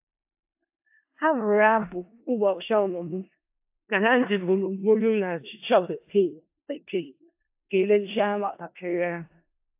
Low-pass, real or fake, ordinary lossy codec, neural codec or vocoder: 3.6 kHz; fake; AAC, 32 kbps; codec, 16 kHz in and 24 kHz out, 0.4 kbps, LongCat-Audio-Codec, four codebook decoder